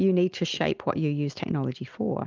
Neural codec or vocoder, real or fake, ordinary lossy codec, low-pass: none; real; Opus, 32 kbps; 7.2 kHz